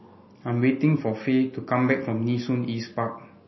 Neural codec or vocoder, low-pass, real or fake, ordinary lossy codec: none; 7.2 kHz; real; MP3, 24 kbps